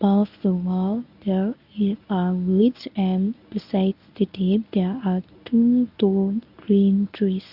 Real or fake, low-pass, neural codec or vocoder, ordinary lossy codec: fake; 5.4 kHz; codec, 24 kHz, 0.9 kbps, WavTokenizer, medium speech release version 1; none